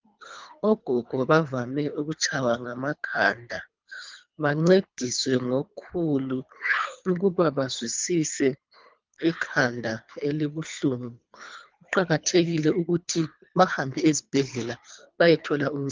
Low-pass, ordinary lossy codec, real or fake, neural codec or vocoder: 7.2 kHz; Opus, 24 kbps; fake; codec, 24 kHz, 3 kbps, HILCodec